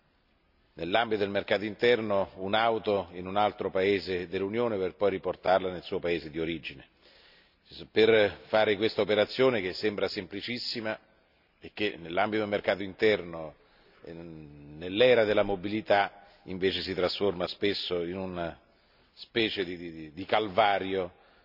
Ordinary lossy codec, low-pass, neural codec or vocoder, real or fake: none; 5.4 kHz; none; real